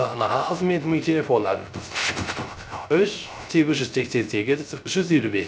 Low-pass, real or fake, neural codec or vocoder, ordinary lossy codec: none; fake; codec, 16 kHz, 0.3 kbps, FocalCodec; none